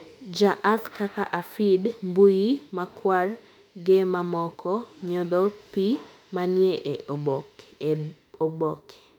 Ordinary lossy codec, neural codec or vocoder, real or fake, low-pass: none; autoencoder, 48 kHz, 32 numbers a frame, DAC-VAE, trained on Japanese speech; fake; 19.8 kHz